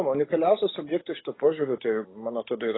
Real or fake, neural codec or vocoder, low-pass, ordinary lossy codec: real; none; 7.2 kHz; AAC, 16 kbps